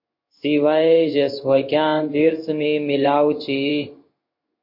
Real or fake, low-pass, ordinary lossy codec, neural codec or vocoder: fake; 5.4 kHz; MP3, 32 kbps; codec, 16 kHz in and 24 kHz out, 1 kbps, XY-Tokenizer